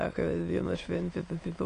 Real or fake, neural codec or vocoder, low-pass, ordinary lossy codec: fake; autoencoder, 22.05 kHz, a latent of 192 numbers a frame, VITS, trained on many speakers; 9.9 kHz; AAC, 48 kbps